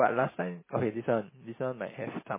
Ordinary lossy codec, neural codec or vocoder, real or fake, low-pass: MP3, 16 kbps; none; real; 3.6 kHz